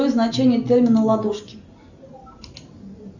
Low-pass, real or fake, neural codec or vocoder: 7.2 kHz; real; none